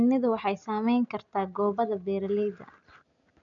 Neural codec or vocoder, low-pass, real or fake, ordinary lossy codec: none; 7.2 kHz; real; none